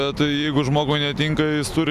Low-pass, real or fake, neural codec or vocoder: 14.4 kHz; real; none